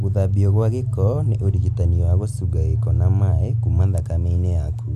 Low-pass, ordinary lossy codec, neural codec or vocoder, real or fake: 14.4 kHz; AAC, 96 kbps; none; real